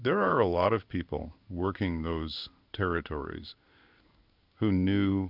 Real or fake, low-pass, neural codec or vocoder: fake; 5.4 kHz; codec, 16 kHz in and 24 kHz out, 1 kbps, XY-Tokenizer